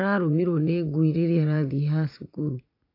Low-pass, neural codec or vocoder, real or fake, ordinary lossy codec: 5.4 kHz; vocoder, 44.1 kHz, 80 mel bands, Vocos; fake; AAC, 32 kbps